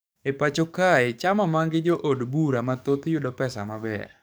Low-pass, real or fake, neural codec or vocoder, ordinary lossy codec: none; fake; codec, 44.1 kHz, 7.8 kbps, DAC; none